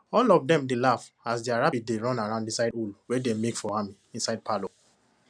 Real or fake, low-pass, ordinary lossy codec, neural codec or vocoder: real; 9.9 kHz; none; none